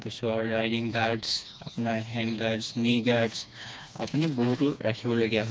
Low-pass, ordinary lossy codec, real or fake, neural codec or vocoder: none; none; fake; codec, 16 kHz, 2 kbps, FreqCodec, smaller model